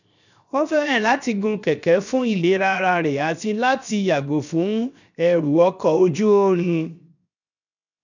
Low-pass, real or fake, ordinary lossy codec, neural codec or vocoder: 7.2 kHz; fake; none; codec, 16 kHz, 0.7 kbps, FocalCodec